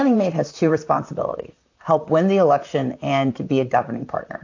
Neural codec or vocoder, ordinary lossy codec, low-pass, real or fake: vocoder, 44.1 kHz, 128 mel bands, Pupu-Vocoder; AAC, 48 kbps; 7.2 kHz; fake